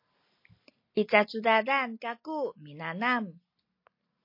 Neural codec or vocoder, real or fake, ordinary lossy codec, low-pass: none; real; MP3, 32 kbps; 5.4 kHz